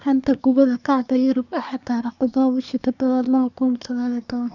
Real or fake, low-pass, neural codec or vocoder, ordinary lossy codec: fake; 7.2 kHz; codec, 24 kHz, 1 kbps, SNAC; none